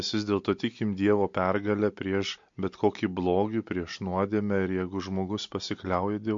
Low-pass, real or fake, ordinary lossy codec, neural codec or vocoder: 7.2 kHz; real; MP3, 48 kbps; none